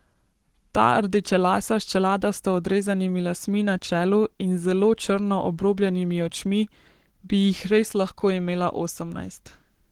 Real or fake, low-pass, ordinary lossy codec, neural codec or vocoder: fake; 19.8 kHz; Opus, 16 kbps; codec, 44.1 kHz, 7.8 kbps, Pupu-Codec